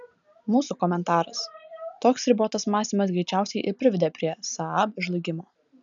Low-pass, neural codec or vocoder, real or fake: 7.2 kHz; none; real